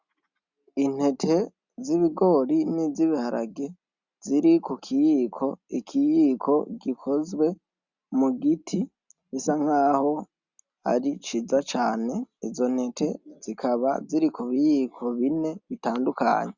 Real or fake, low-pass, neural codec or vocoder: real; 7.2 kHz; none